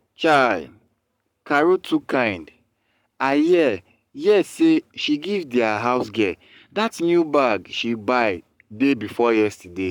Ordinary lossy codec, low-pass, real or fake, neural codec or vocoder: none; 19.8 kHz; fake; codec, 44.1 kHz, 7.8 kbps, Pupu-Codec